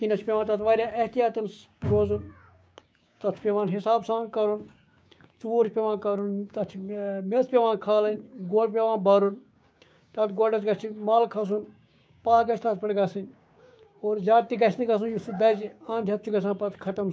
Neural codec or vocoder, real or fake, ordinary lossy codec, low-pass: codec, 16 kHz, 6 kbps, DAC; fake; none; none